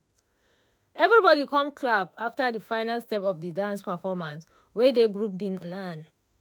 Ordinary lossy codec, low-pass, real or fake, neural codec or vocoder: none; none; fake; autoencoder, 48 kHz, 32 numbers a frame, DAC-VAE, trained on Japanese speech